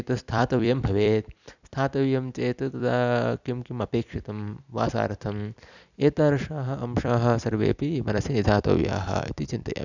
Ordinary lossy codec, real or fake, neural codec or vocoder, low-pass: none; fake; vocoder, 44.1 kHz, 128 mel bands every 256 samples, BigVGAN v2; 7.2 kHz